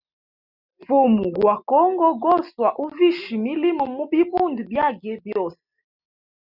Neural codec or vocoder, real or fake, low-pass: none; real; 5.4 kHz